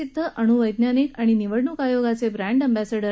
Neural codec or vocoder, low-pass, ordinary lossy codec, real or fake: none; none; none; real